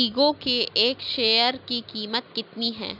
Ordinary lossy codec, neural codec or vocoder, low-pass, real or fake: none; none; 5.4 kHz; real